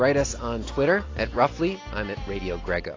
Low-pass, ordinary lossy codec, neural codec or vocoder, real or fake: 7.2 kHz; AAC, 32 kbps; none; real